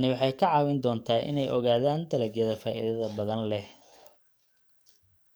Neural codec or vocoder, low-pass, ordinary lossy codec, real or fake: codec, 44.1 kHz, 7.8 kbps, DAC; none; none; fake